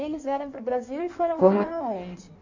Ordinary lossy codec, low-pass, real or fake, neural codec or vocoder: Opus, 64 kbps; 7.2 kHz; fake; codec, 16 kHz in and 24 kHz out, 1.1 kbps, FireRedTTS-2 codec